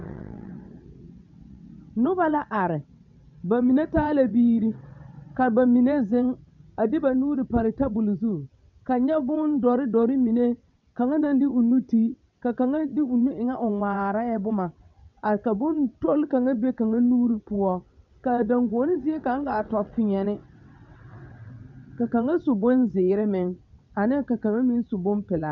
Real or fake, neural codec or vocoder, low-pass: fake; vocoder, 22.05 kHz, 80 mel bands, WaveNeXt; 7.2 kHz